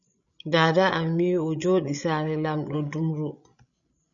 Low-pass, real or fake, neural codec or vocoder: 7.2 kHz; fake; codec, 16 kHz, 16 kbps, FreqCodec, larger model